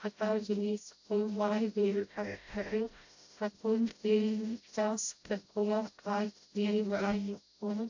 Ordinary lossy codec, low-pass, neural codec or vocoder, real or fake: AAC, 48 kbps; 7.2 kHz; codec, 16 kHz, 0.5 kbps, FreqCodec, smaller model; fake